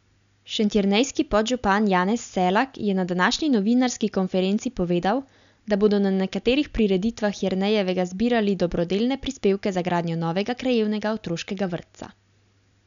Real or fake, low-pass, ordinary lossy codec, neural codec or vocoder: real; 7.2 kHz; none; none